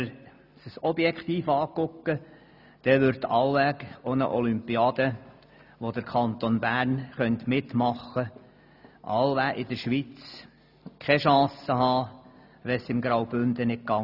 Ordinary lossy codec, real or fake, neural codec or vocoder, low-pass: none; real; none; 5.4 kHz